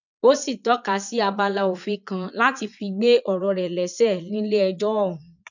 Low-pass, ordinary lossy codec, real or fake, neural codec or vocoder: 7.2 kHz; none; fake; vocoder, 22.05 kHz, 80 mel bands, Vocos